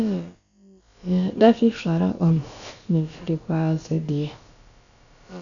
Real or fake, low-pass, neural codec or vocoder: fake; 7.2 kHz; codec, 16 kHz, about 1 kbps, DyCAST, with the encoder's durations